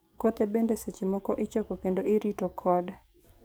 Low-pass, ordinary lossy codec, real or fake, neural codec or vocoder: none; none; fake; codec, 44.1 kHz, 7.8 kbps, DAC